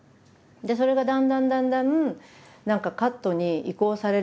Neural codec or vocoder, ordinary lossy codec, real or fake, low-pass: none; none; real; none